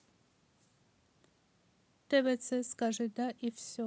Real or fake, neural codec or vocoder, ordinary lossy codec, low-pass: real; none; none; none